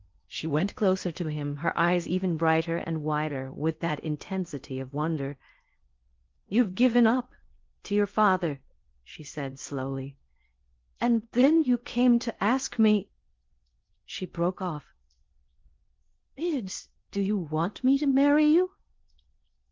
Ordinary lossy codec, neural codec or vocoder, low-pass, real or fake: Opus, 32 kbps; codec, 16 kHz in and 24 kHz out, 0.6 kbps, FocalCodec, streaming, 4096 codes; 7.2 kHz; fake